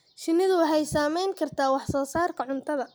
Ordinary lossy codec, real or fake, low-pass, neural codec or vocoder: none; real; none; none